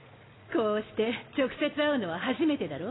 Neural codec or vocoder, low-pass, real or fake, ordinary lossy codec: none; 7.2 kHz; real; AAC, 16 kbps